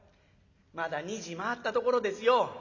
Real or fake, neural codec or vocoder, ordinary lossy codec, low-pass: real; none; none; 7.2 kHz